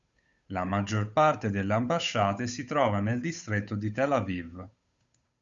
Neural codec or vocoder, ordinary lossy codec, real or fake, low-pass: codec, 16 kHz, 8 kbps, FunCodec, trained on Chinese and English, 25 frames a second; Opus, 64 kbps; fake; 7.2 kHz